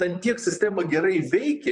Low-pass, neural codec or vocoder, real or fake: 10.8 kHz; vocoder, 44.1 kHz, 128 mel bands, Pupu-Vocoder; fake